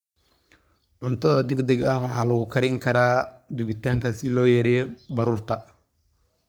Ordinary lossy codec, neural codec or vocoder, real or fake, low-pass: none; codec, 44.1 kHz, 3.4 kbps, Pupu-Codec; fake; none